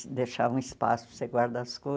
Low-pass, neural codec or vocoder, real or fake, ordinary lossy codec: none; none; real; none